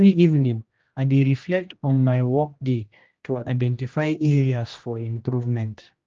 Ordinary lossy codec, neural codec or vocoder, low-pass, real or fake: Opus, 24 kbps; codec, 16 kHz, 1 kbps, X-Codec, HuBERT features, trained on general audio; 7.2 kHz; fake